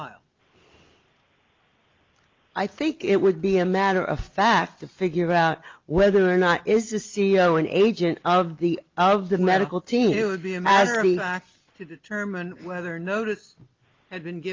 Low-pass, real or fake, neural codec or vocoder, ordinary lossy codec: 7.2 kHz; real; none; Opus, 32 kbps